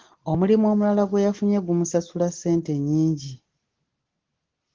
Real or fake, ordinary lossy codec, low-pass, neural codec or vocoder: real; Opus, 16 kbps; 7.2 kHz; none